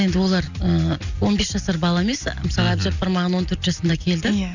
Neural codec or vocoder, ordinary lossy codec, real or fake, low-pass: none; none; real; 7.2 kHz